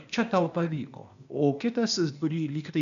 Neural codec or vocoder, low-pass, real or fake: codec, 16 kHz, 0.8 kbps, ZipCodec; 7.2 kHz; fake